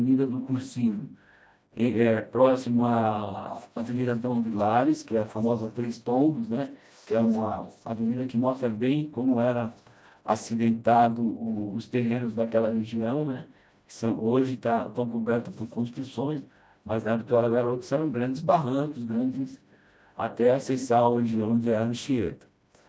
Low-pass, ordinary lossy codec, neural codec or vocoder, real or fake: none; none; codec, 16 kHz, 1 kbps, FreqCodec, smaller model; fake